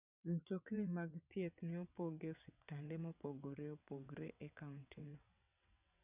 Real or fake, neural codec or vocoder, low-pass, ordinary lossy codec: fake; vocoder, 22.05 kHz, 80 mel bands, WaveNeXt; 3.6 kHz; none